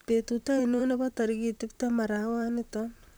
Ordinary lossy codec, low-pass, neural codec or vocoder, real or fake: none; none; vocoder, 44.1 kHz, 128 mel bands, Pupu-Vocoder; fake